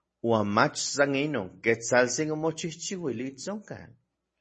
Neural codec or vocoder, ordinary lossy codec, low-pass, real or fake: none; MP3, 32 kbps; 10.8 kHz; real